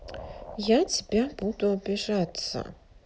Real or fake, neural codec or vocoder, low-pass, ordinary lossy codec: real; none; none; none